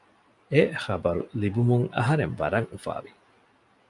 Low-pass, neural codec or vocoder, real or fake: 10.8 kHz; none; real